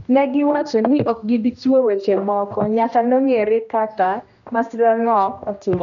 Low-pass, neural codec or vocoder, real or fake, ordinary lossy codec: 7.2 kHz; codec, 16 kHz, 1 kbps, X-Codec, HuBERT features, trained on general audio; fake; none